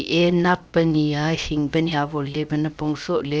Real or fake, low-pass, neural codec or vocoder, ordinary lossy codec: fake; none; codec, 16 kHz, 0.7 kbps, FocalCodec; none